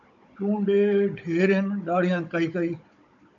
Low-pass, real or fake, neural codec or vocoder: 7.2 kHz; fake; codec, 16 kHz, 16 kbps, FunCodec, trained on Chinese and English, 50 frames a second